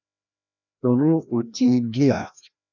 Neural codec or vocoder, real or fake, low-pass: codec, 16 kHz, 1 kbps, FreqCodec, larger model; fake; 7.2 kHz